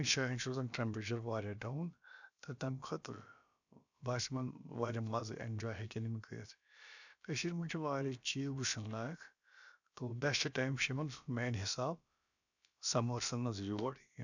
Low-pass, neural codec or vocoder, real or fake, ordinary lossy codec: 7.2 kHz; codec, 16 kHz, about 1 kbps, DyCAST, with the encoder's durations; fake; none